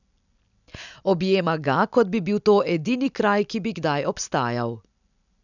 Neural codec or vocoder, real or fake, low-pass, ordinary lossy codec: none; real; 7.2 kHz; none